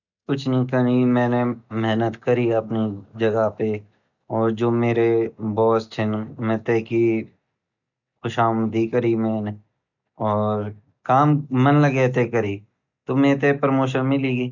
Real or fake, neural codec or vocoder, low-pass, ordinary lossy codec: real; none; 7.2 kHz; none